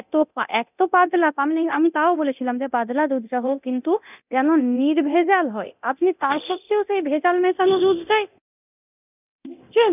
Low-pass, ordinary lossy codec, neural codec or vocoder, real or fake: 3.6 kHz; none; codec, 24 kHz, 0.9 kbps, DualCodec; fake